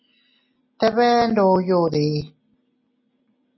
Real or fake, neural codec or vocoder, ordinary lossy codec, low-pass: real; none; MP3, 24 kbps; 7.2 kHz